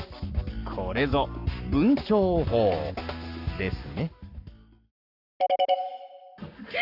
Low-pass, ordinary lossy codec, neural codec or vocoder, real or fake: 5.4 kHz; none; codec, 44.1 kHz, 7.8 kbps, Pupu-Codec; fake